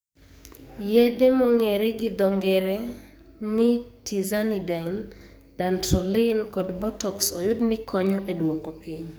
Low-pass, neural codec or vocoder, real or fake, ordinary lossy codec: none; codec, 44.1 kHz, 2.6 kbps, SNAC; fake; none